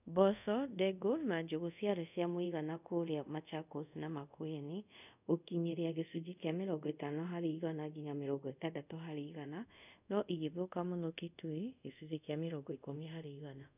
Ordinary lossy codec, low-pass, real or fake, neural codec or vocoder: none; 3.6 kHz; fake; codec, 24 kHz, 0.5 kbps, DualCodec